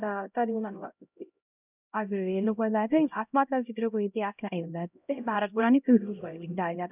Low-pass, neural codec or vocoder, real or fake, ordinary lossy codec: 3.6 kHz; codec, 16 kHz, 0.5 kbps, X-Codec, HuBERT features, trained on LibriSpeech; fake; none